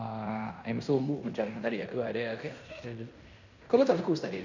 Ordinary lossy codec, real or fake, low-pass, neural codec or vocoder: none; fake; 7.2 kHz; codec, 16 kHz in and 24 kHz out, 0.9 kbps, LongCat-Audio-Codec, fine tuned four codebook decoder